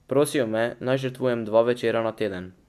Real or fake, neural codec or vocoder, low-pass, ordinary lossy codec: real; none; 14.4 kHz; AAC, 96 kbps